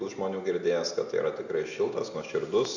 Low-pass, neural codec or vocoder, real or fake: 7.2 kHz; none; real